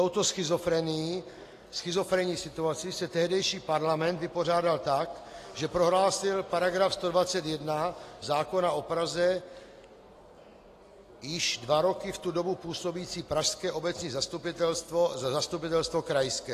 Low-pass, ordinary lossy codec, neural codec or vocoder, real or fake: 14.4 kHz; AAC, 48 kbps; none; real